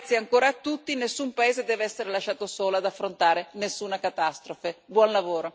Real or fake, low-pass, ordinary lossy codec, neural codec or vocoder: real; none; none; none